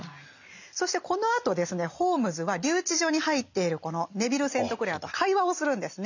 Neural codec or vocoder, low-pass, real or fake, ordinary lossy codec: none; 7.2 kHz; real; none